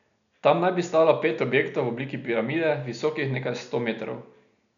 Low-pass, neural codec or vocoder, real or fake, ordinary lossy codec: 7.2 kHz; none; real; none